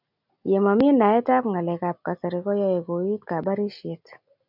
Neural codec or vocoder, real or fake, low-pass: none; real; 5.4 kHz